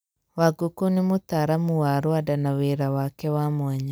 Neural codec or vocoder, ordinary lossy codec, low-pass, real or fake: none; none; none; real